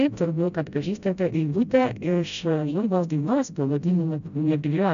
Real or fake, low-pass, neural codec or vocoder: fake; 7.2 kHz; codec, 16 kHz, 0.5 kbps, FreqCodec, smaller model